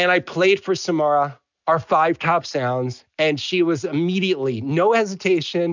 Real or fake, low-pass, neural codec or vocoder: real; 7.2 kHz; none